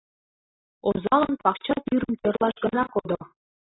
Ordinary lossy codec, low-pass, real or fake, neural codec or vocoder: AAC, 16 kbps; 7.2 kHz; real; none